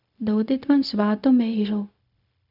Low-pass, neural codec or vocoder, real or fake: 5.4 kHz; codec, 16 kHz, 0.4 kbps, LongCat-Audio-Codec; fake